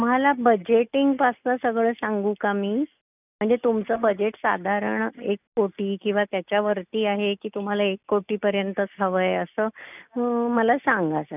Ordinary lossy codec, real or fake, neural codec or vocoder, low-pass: none; real; none; 3.6 kHz